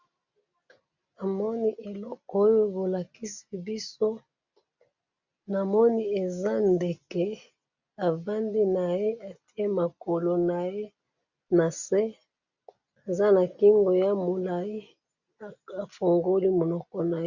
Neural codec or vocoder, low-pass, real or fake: none; 7.2 kHz; real